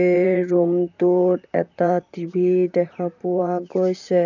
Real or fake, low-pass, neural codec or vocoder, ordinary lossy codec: fake; 7.2 kHz; vocoder, 44.1 kHz, 128 mel bands, Pupu-Vocoder; none